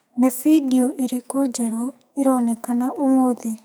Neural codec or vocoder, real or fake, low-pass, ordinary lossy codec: codec, 44.1 kHz, 2.6 kbps, SNAC; fake; none; none